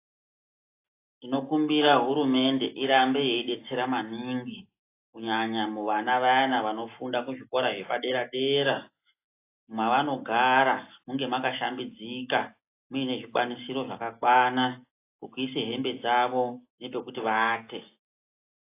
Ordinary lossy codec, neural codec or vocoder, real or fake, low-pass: AAC, 24 kbps; none; real; 3.6 kHz